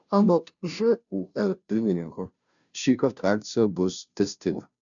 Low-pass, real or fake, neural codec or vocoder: 7.2 kHz; fake; codec, 16 kHz, 0.5 kbps, FunCodec, trained on Chinese and English, 25 frames a second